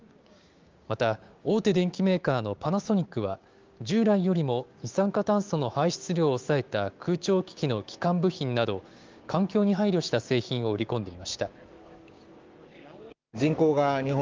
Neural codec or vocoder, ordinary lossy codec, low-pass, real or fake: codec, 16 kHz, 6 kbps, DAC; Opus, 32 kbps; 7.2 kHz; fake